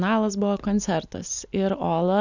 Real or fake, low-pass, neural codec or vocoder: real; 7.2 kHz; none